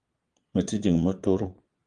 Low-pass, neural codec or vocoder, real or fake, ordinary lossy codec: 9.9 kHz; vocoder, 22.05 kHz, 80 mel bands, Vocos; fake; Opus, 32 kbps